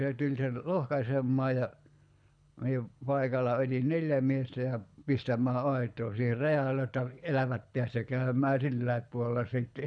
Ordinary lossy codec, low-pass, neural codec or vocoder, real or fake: none; 9.9 kHz; codec, 24 kHz, 6 kbps, HILCodec; fake